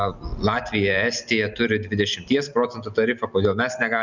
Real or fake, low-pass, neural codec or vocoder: real; 7.2 kHz; none